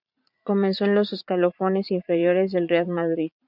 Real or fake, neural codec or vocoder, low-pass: fake; vocoder, 44.1 kHz, 80 mel bands, Vocos; 5.4 kHz